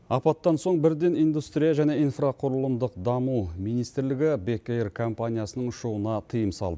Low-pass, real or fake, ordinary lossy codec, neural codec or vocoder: none; real; none; none